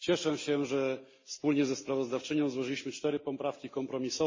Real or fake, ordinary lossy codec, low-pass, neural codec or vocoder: real; MP3, 32 kbps; 7.2 kHz; none